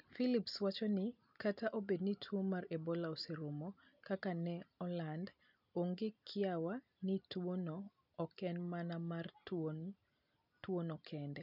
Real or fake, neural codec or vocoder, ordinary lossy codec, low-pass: real; none; none; 5.4 kHz